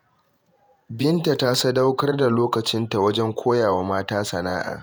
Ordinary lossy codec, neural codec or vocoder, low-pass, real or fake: none; vocoder, 48 kHz, 128 mel bands, Vocos; none; fake